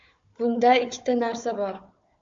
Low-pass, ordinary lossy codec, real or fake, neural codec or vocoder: 7.2 kHz; AAC, 64 kbps; fake; codec, 16 kHz, 4 kbps, FunCodec, trained on Chinese and English, 50 frames a second